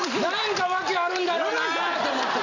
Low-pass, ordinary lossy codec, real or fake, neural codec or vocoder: 7.2 kHz; none; real; none